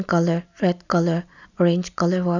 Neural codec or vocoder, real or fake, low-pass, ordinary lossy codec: none; real; 7.2 kHz; none